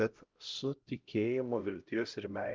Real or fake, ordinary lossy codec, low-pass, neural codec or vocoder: fake; Opus, 32 kbps; 7.2 kHz; codec, 16 kHz, 0.5 kbps, X-Codec, HuBERT features, trained on LibriSpeech